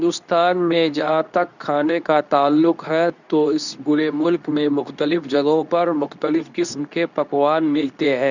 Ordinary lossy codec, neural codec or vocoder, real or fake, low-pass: none; codec, 24 kHz, 0.9 kbps, WavTokenizer, medium speech release version 2; fake; 7.2 kHz